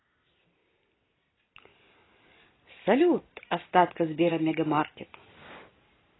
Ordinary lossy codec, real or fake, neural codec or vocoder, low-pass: AAC, 16 kbps; real; none; 7.2 kHz